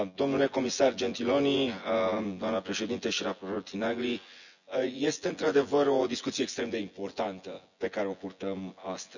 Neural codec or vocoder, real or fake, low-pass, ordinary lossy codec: vocoder, 24 kHz, 100 mel bands, Vocos; fake; 7.2 kHz; none